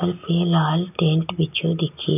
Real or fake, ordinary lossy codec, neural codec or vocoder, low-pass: real; none; none; 3.6 kHz